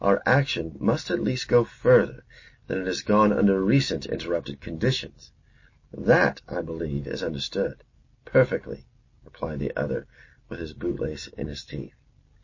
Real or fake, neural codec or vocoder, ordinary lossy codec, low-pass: real; none; MP3, 32 kbps; 7.2 kHz